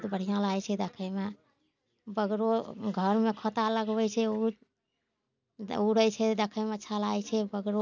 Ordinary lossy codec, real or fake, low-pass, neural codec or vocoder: none; real; 7.2 kHz; none